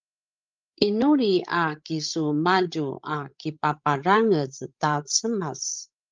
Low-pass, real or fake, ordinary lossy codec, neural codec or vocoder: 7.2 kHz; fake; Opus, 32 kbps; codec, 16 kHz, 16 kbps, FreqCodec, larger model